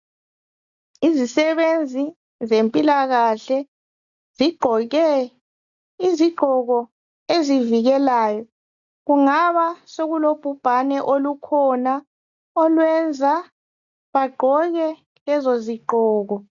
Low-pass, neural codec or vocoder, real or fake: 7.2 kHz; none; real